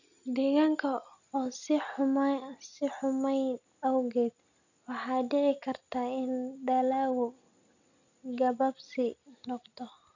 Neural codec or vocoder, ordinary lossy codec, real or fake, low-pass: none; none; real; 7.2 kHz